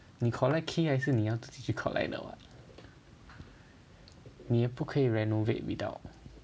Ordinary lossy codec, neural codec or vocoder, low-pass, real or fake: none; none; none; real